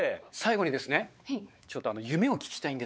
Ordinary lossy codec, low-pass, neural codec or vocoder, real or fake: none; none; codec, 16 kHz, 4 kbps, X-Codec, WavLM features, trained on Multilingual LibriSpeech; fake